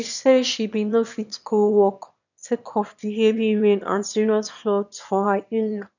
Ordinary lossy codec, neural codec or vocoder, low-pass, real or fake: none; autoencoder, 22.05 kHz, a latent of 192 numbers a frame, VITS, trained on one speaker; 7.2 kHz; fake